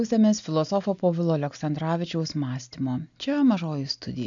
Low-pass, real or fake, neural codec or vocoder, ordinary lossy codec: 7.2 kHz; real; none; AAC, 64 kbps